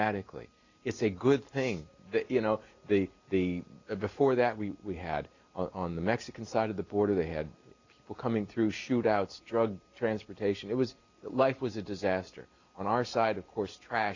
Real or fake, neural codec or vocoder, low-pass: real; none; 7.2 kHz